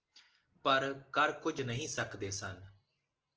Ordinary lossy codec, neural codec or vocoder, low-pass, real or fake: Opus, 16 kbps; none; 7.2 kHz; real